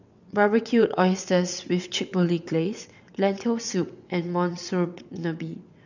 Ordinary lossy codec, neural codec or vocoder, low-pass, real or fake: none; vocoder, 22.05 kHz, 80 mel bands, WaveNeXt; 7.2 kHz; fake